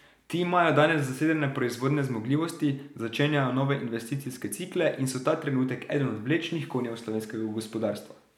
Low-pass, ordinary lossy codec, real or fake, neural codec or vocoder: 19.8 kHz; none; real; none